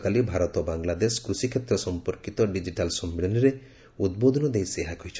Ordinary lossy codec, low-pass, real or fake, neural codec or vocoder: none; none; real; none